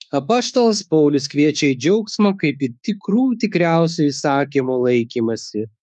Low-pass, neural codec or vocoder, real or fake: 10.8 kHz; autoencoder, 48 kHz, 32 numbers a frame, DAC-VAE, trained on Japanese speech; fake